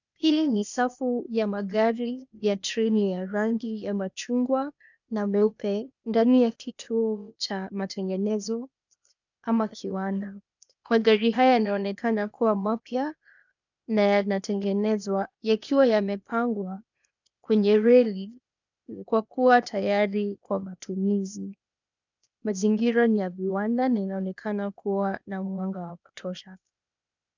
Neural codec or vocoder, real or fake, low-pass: codec, 16 kHz, 0.8 kbps, ZipCodec; fake; 7.2 kHz